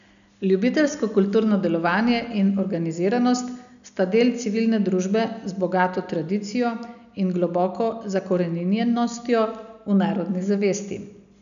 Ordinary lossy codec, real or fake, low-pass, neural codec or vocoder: none; real; 7.2 kHz; none